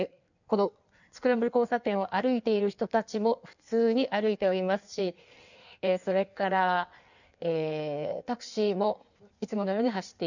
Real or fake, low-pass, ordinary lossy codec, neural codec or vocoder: fake; 7.2 kHz; none; codec, 16 kHz in and 24 kHz out, 1.1 kbps, FireRedTTS-2 codec